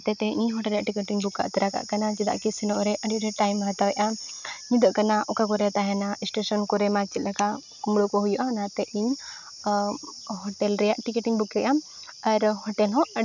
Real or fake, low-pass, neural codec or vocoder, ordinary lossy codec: real; 7.2 kHz; none; none